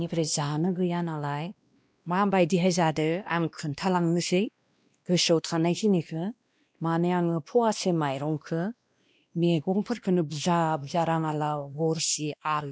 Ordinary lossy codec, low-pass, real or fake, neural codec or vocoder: none; none; fake; codec, 16 kHz, 1 kbps, X-Codec, WavLM features, trained on Multilingual LibriSpeech